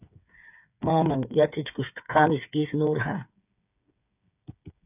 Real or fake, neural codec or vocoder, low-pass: fake; codec, 16 kHz, 8 kbps, FreqCodec, smaller model; 3.6 kHz